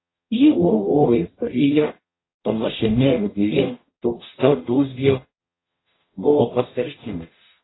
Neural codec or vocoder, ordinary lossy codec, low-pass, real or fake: codec, 44.1 kHz, 0.9 kbps, DAC; AAC, 16 kbps; 7.2 kHz; fake